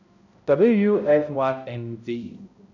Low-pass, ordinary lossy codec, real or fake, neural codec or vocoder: 7.2 kHz; none; fake; codec, 16 kHz, 0.5 kbps, X-Codec, HuBERT features, trained on balanced general audio